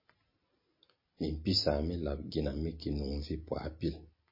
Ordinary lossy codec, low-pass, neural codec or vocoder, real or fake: MP3, 24 kbps; 5.4 kHz; none; real